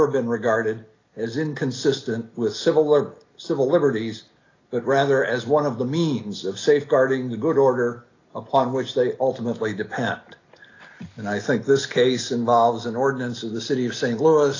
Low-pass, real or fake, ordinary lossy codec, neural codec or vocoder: 7.2 kHz; real; AAC, 32 kbps; none